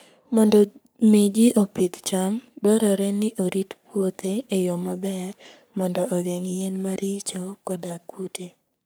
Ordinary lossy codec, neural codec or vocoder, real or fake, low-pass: none; codec, 44.1 kHz, 3.4 kbps, Pupu-Codec; fake; none